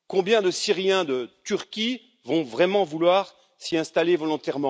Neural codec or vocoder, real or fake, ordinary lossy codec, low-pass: none; real; none; none